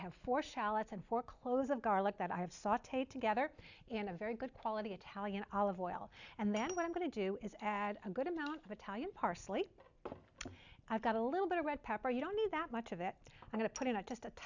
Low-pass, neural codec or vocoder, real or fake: 7.2 kHz; none; real